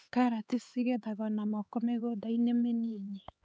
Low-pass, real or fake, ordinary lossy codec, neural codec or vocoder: none; fake; none; codec, 16 kHz, 4 kbps, X-Codec, HuBERT features, trained on LibriSpeech